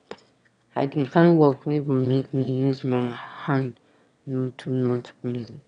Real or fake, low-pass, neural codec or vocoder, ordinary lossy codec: fake; 9.9 kHz; autoencoder, 22.05 kHz, a latent of 192 numbers a frame, VITS, trained on one speaker; none